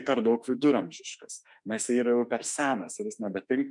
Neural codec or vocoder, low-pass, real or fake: autoencoder, 48 kHz, 32 numbers a frame, DAC-VAE, trained on Japanese speech; 10.8 kHz; fake